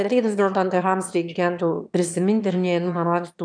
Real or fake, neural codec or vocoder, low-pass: fake; autoencoder, 22.05 kHz, a latent of 192 numbers a frame, VITS, trained on one speaker; 9.9 kHz